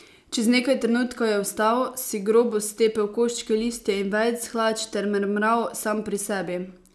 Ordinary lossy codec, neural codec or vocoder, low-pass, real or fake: none; none; none; real